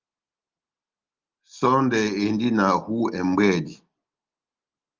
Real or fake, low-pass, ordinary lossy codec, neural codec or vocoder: real; 7.2 kHz; Opus, 24 kbps; none